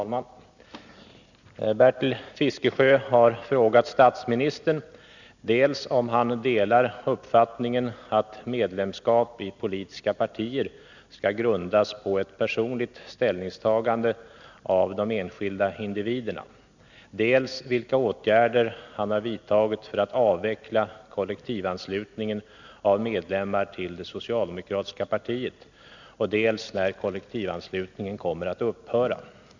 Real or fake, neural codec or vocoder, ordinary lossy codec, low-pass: real; none; none; 7.2 kHz